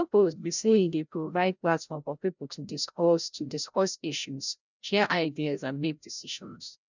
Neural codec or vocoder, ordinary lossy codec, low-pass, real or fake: codec, 16 kHz, 0.5 kbps, FreqCodec, larger model; none; 7.2 kHz; fake